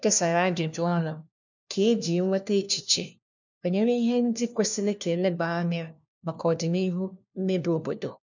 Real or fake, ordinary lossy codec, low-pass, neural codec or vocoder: fake; none; 7.2 kHz; codec, 16 kHz, 1 kbps, FunCodec, trained on LibriTTS, 50 frames a second